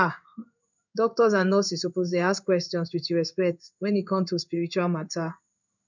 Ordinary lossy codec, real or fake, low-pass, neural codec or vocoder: none; fake; 7.2 kHz; codec, 16 kHz in and 24 kHz out, 1 kbps, XY-Tokenizer